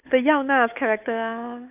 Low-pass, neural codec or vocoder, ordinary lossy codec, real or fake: 3.6 kHz; codec, 16 kHz, 8 kbps, FunCodec, trained on Chinese and English, 25 frames a second; none; fake